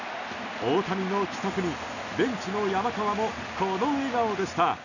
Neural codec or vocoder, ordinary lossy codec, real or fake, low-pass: none; none; real; 7.2 kHz